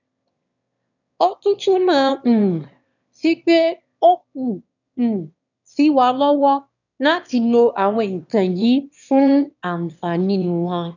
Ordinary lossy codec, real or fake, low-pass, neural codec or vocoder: none; fake; 7.2 kHz; autoencoder, 22.05 kHz, a latent of 192 numbers a frame, VITS, trained on one speaker